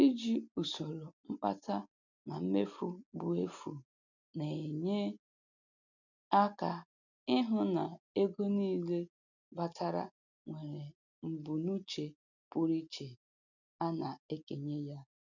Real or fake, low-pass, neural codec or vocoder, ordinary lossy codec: real; 7.2 kHz; none; none